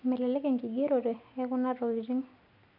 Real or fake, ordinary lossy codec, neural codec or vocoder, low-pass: real; none; none; 5.4 kHz